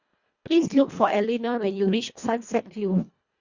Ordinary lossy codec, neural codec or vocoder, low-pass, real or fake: Opus, 64 kbps; codec, 24 kHz, 1.5 kbps, HILCodec; 7.2 kHz; fake